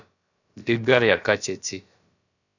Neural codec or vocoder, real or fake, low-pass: codec, 16 kHz, about 1 kbps, DyCAST, with the encoder's durations; fake; 7.2 kHz